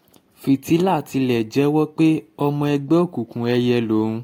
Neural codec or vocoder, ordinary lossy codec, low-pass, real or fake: none; AAC, 48 kbps; 19.8 kHz; real